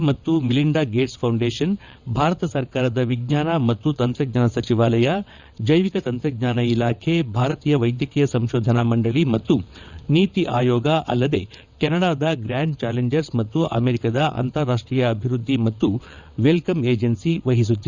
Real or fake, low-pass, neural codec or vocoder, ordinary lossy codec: fake; 7.2 kHz; vocoder, 22.05 kHz, 80 mel bands, WaveNeXt; none